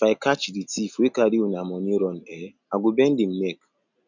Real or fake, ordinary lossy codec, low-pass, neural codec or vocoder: real; none; 7.2 kHz; none